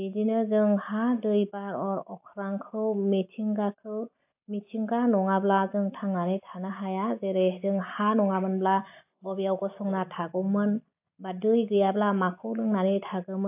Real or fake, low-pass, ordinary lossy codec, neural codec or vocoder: real; 3.6 kHz; AAC, 32 kbps; none